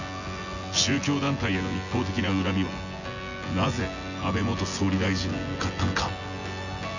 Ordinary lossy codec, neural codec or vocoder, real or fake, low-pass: none; vocoder, 24 kHz, 100 mel bands, Vocos; fake; 7.2 kHz